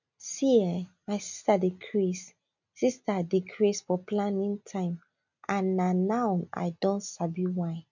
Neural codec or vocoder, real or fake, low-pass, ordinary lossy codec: none; real; 7.2 kHz; none